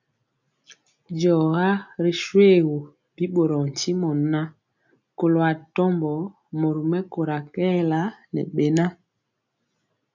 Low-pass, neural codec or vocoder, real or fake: 7.2 kHz; none; real